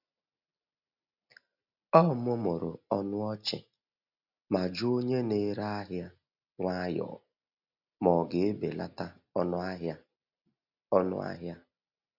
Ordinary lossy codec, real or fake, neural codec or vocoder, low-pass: none; real; none; 5.4 kHz